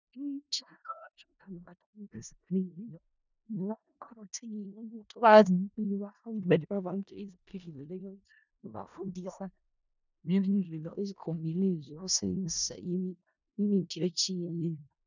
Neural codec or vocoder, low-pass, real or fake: codec, 16 kHz in and 24 kHz out, 0.4 kbps, LongCat-Audio-Codec, four codebook decoder; 7.2 kHz; fake